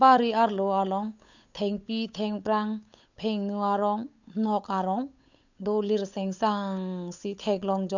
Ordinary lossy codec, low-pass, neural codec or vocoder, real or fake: none; 7.2 kHz; codec, 16 kHz, 8 kbps, FunCodec, trained on Chinese and English, 25 frames a second; fake